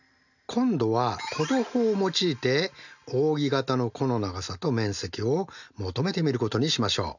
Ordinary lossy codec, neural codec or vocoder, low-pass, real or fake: none; none; 7.2 kHz; real